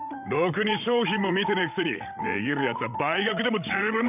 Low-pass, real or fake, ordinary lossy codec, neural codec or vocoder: 3.6 kHz; fake; none; codec, 16 kHz, 16 kbps, FreqCodec, larger model